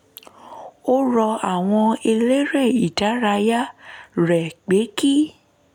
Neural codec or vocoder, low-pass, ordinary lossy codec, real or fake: none; 19.8 kHz; none; real